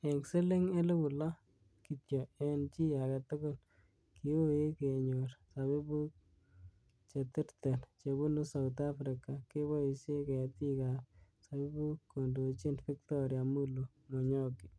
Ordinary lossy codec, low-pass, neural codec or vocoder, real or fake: none; none; none; real